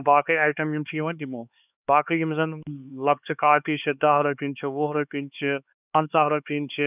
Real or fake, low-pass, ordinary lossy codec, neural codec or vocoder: fake; 3.6 kHz; none; codec, 16 kHz, 4 kbps, X-Codec, HuBERT features, trained on LibriSpeech